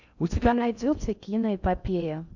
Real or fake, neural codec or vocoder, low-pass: fake; codec, 16 kHz in and 24 kHz out, 0.6 kbps, FocalCodec, streaming, 2048 codes; 7.2 kHz